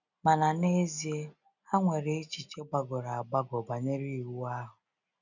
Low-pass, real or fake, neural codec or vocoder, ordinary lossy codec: 7.2 kHz; real; none; none